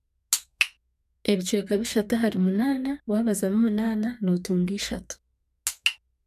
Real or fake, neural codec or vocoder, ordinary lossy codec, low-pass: fake; codec, 32 kHz, 1.9 kbps, SNAC; none; 14.4 kHz